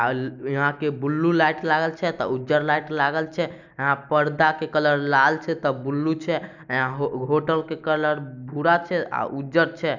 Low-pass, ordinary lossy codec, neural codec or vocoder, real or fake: 7.2 kHz; none; none; real